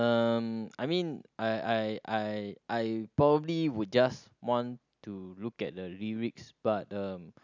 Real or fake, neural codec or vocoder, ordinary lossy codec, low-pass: real; none; none; 7.2 kHz